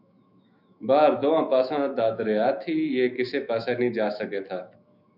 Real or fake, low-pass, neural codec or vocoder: fake; 5.4 kHz; autoencoder, 48 kHz, 128 numbers a frame, DAC-VAE, trained on Japanese speech